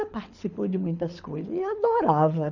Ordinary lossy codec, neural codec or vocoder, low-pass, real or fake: none; codec, 24 kHz, 6 kbps, HILCodec; 7.2 kHz; fake